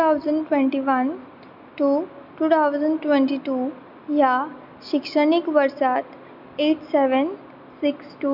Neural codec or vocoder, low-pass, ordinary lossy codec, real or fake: none; 5.4 kHz; none; real